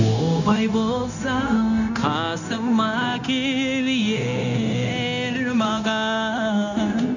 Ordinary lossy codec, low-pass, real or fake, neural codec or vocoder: none; 7.2 kHz; fake; codec, 16 kHz, 0.9 kbps, LongCat-Audio-Codec